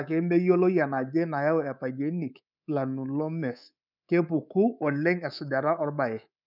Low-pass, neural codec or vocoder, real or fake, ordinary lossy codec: 5.4 kHz; codec, 24 kHz, 3.1 kbps, DualCodec; fake; none